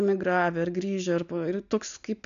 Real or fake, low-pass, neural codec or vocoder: real; 7.2 kHz; none